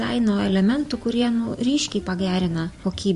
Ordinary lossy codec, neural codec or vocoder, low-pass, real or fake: MP3, 48 kbps; vocoder, 44.1 kHz, 128 mel bands every 512 samples, BigVGAN v2; 14.4 kHz; fake